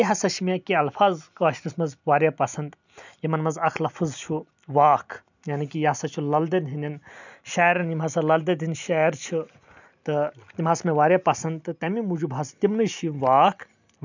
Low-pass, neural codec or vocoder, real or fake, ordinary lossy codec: 7.2 kHz; none; real; none